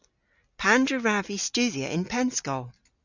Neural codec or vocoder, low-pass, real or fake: none; 7.2 kHz; real